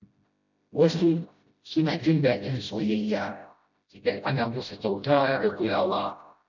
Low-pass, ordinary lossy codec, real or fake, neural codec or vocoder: 7.2 kHz; none; fake; codec, 16 kHz, 0.5 kbps, FreqCodec, smaller model